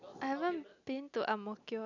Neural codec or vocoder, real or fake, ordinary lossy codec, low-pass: none; real; none; 7.2 kHz